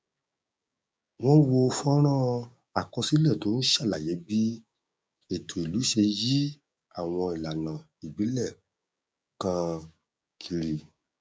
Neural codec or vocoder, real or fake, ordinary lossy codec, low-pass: codec, 16 kHz, 6 kbps, DAC; fake; none; none